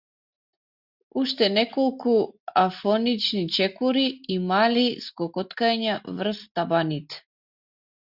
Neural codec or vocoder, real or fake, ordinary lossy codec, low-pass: none; real; Opus, 64 kbps; 5.4 kHz